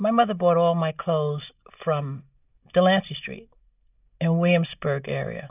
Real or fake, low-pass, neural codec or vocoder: real; 3.6 kHz; none